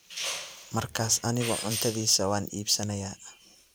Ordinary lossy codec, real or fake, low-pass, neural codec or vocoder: none; fake; none; vocoder, 44.1 kHz, 128 mel bands every 512 samples, BigVGAN v2